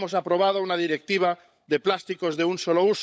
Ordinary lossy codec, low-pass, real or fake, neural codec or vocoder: none; none; fake; codec, 16 kHz, 16 kbps, FunCodec, trained on LibriTTS, 50 frames a second